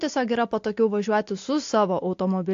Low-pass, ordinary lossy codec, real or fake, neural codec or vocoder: 7.2 kHz; AAC, 48 kbps; real; none